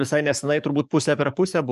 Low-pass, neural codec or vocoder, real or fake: 14.4 kHz; codec, 44.1 kHz, 7.8 kbps, DAC; fake